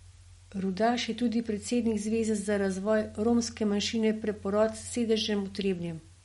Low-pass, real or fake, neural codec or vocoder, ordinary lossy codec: 19.8 kHz; real; none; MP3, 48 kbps